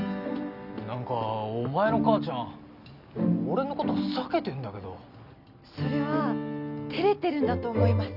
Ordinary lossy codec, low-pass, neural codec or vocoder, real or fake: none; 5.4 kHz; none; real